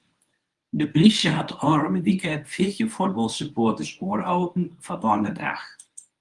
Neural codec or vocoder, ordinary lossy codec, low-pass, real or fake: codec, 24 kHz, 0.9 kbps, WavTokenizer, medium speech release version 1; Opus, 32 kbps; 10.8 kHz; fake